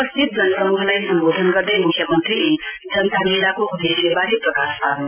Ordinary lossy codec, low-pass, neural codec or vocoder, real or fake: none; 3.6 kHz; none; real